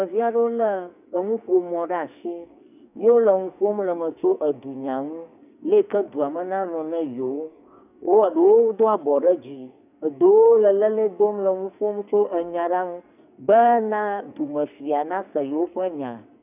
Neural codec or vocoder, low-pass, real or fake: codec, 44.1 kHz, 2.6 kbps, SNAC; 3.6 kHz; fake